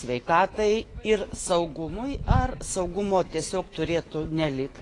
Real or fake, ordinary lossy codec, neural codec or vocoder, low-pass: fake; AAC, 32 kbps; autoencoder, 48 kHz, 128 numbers a frame, DAC-VAE, trained on Japanese speech; 10.8 kHz